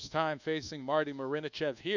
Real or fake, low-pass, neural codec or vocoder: fake; 7.2 kHz; codec, 24 kHz, 1.2 kbps, DualCodec